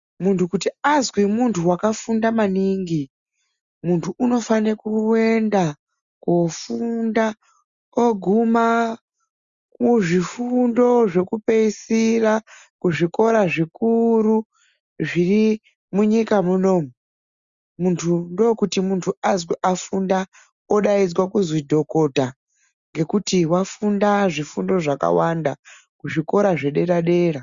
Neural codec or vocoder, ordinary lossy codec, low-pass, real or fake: none; Opus, 64 kbps; 7.2 kHz; real